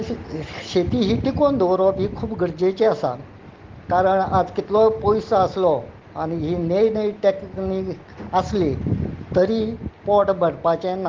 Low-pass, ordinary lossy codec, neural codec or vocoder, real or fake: 7.2 kHz; Opus, 16 kbps; none; real